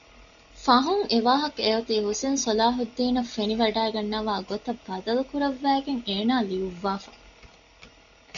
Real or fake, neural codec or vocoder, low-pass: real; none; 7.2 kHz